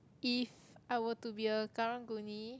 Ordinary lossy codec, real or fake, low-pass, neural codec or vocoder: none; real; none; none